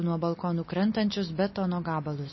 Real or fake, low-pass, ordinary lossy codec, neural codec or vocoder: real; 7.2 kHz; MP3, 24 kbps; none